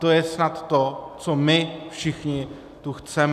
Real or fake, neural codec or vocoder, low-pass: real; none; 14.4 kHz